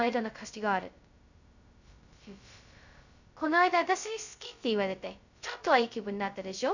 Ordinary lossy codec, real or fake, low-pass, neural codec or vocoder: none; fake; 7.2 kHz; codec, 16 kHz, 0.2 kbps, FocalCodec